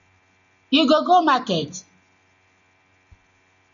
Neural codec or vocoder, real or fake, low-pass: none; real; 7.2 kHz